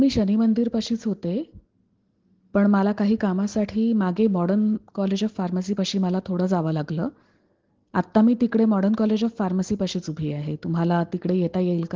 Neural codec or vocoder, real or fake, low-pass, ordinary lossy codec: none; real; 7.2 kHz; Opus, 16 kbps